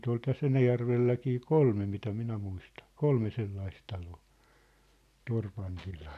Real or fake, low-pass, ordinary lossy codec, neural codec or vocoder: real; 14.4 kHz; none; none